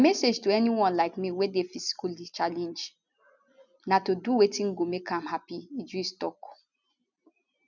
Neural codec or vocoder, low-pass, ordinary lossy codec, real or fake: none; 7.2 kHz; none; real